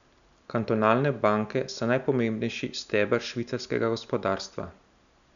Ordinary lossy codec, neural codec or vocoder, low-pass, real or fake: none; none; 7.2 kHz; real